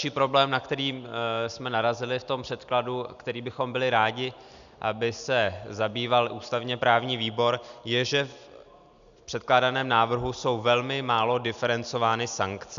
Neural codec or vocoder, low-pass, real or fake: none; 7.2 kHz; real